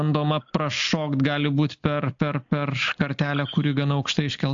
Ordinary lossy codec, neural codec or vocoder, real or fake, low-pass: AAC, 64 kbps; none; real; 7.2 kHz